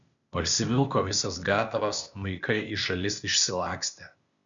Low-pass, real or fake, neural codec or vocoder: 7.2 kHz; fake; codec, 16 kHz, 0.8 kbps, ZipCodec